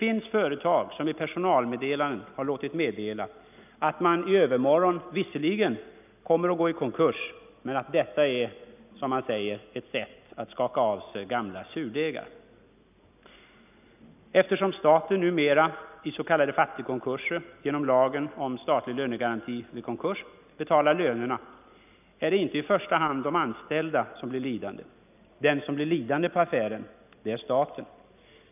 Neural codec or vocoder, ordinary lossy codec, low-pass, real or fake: none; none; 3.6 kHz; real